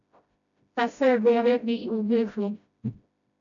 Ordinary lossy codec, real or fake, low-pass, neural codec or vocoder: MP3, 96 kbps; fake; 7.2 kHz; codec, 16 kHz, 0.5 kbps, FreqCodec, smaller model